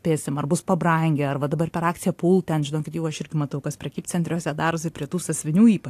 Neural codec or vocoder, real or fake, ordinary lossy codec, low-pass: none; real; AAC, 64 kbps; 14.4 kHz